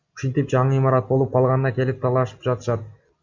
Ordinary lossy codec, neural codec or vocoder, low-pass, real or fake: Opus, 64 kbps; none; 7.2 kHz; real